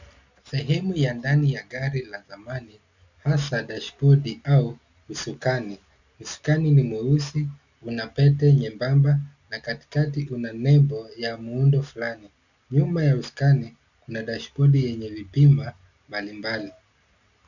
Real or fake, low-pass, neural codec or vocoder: real; 7.2 kHz; none